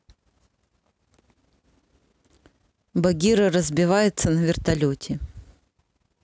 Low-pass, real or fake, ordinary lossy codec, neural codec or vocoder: none; real; none; none